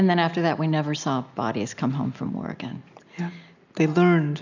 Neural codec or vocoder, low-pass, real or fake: none; 7.2 kHz; real